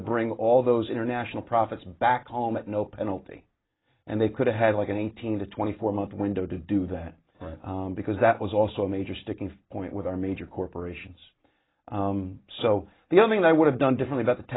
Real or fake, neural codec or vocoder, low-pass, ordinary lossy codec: real; none; 7.2 kHz; AAC, 16 kbps